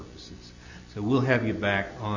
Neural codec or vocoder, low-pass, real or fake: none; 7.2 kHz; real